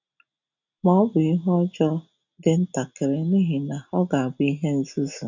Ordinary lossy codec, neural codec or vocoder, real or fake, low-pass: none; none; real; 7.2 kHz